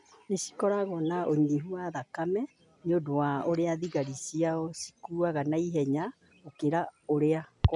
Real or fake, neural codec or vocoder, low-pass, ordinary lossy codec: real; none; 10.8 kHz; none